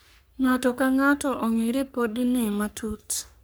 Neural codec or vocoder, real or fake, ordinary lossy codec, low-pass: codec, 44.1 kHz, 3.4 kbps, Pupu-Codec; fake; none; none